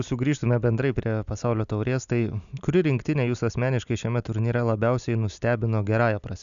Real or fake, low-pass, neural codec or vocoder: real; 7.2 kHz; none